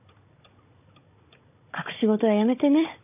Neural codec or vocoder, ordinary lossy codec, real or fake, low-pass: codec, 16 kHz, 16 kbps, FunCodec, trained on Chinese and English, 50 frames a second; AAC, 32 kbps; fake; 3.6 kHz